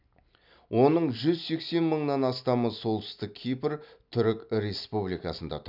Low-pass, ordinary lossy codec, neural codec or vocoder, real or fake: 5.4 kHz; none; none; real